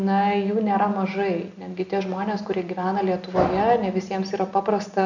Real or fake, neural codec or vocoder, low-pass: real; none; 7.2 kHz